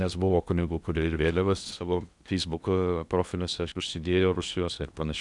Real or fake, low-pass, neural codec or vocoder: fake; 10.8 kHz; codec, 16 kHz in and 24 kHz out, 0.8 kbps, FocalCodec, streaming, 65536 codes